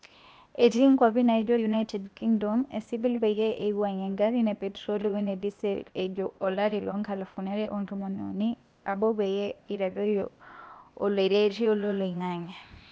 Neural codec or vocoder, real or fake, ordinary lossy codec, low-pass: codec, 16 kHz, 0.8 kbps, ZipCodec; fake; none; none